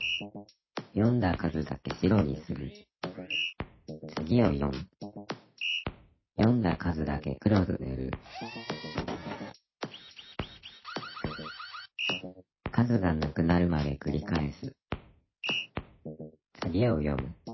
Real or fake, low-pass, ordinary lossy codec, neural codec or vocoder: real; 7.2 kHz; MP3, 24 kbps; none